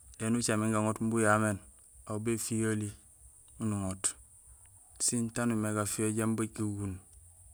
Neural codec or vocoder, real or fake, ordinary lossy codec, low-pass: none; real; none; none